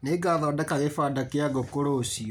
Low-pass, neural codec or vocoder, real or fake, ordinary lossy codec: none; none; real; none